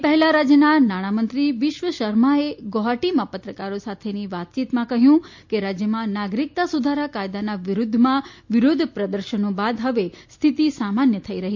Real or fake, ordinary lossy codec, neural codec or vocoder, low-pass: real; MP3, 48 kbps; none; 7.2 kHz